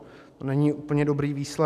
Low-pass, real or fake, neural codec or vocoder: 14.4 kHz; real; none